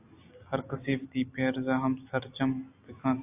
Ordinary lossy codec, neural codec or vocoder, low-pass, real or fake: AAC, 32 kbps; none; 3.6 kHz; real